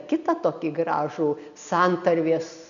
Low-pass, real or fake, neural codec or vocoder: 7.2 kHz; real; none